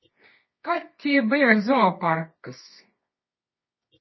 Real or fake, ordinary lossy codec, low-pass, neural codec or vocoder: fake; MP3, 24 kbps; 7.2 kHz; codec, 24 kHz, 0.9 kbps, WavTokenizer, medium music audio release